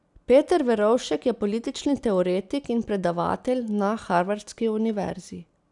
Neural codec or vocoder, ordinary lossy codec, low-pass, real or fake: none; none; 10.8 kHz; real